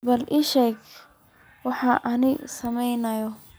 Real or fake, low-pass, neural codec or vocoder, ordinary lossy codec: real; none; none; none